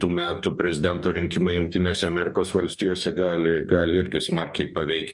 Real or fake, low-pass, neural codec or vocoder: fake; 10.8 kHz; codec, 44.1 kHz, 2.6 kbps, DAC